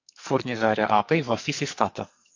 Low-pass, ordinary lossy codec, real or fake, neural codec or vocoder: 7.2 kHz; AAC, 48 kbps; fake; codec, 44.1 kHz, 2.6 kbps, SNAC